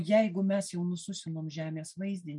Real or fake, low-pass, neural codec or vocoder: real; 10.8 kHz; none